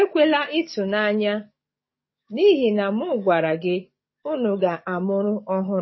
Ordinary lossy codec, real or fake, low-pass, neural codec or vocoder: MP3, 24 kbps; fake; 7.2 kHz; codec, 44.1 kHz, 7.8 kbps, DAC